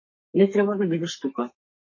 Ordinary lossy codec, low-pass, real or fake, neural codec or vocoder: MP3, 32 kbps; 7.2 kHz; fake; codec, 32 kHz, 1.9 kbps, SNAC